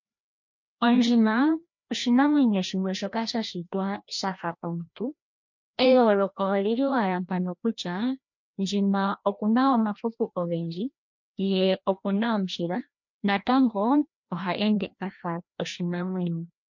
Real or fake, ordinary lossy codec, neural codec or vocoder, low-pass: fake; MP3, 48 kbps; codec, 16 kHz, 1 kbps, FreqCodec, larger model; 7.2 kHz